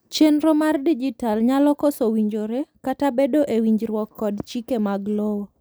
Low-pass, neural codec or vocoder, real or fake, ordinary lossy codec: none; none; real; none